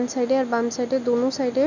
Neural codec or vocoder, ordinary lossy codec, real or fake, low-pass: none; none; real; 7.2 kHz